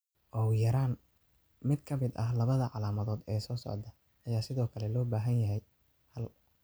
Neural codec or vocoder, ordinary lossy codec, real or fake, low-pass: none; none; real; none